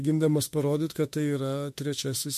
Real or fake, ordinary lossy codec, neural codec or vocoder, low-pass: fake; MP3, 64 kbps; autoencoder, 48 kHz, 32 numbers a frame, DAC-VAE, trained on Japanese speech; 14.4 kHz